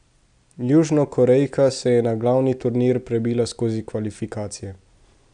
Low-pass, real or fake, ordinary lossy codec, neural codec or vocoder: 9.9 kHz; real; none; none